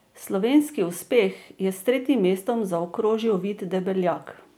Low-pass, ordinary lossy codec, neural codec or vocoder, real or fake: none; none; none; real